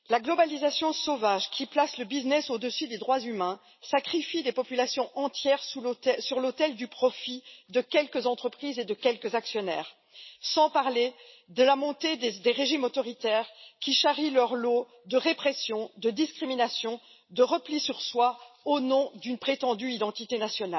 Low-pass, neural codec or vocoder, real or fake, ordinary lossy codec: 7.2 kHz; none; real; MP3, 24 kbps